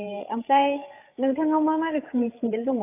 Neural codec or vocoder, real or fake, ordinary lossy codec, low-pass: codec, 16 kHz, 8 kbps, FreqCodec, larger model; fake; AAC, 24 kbps; 3.6 kHz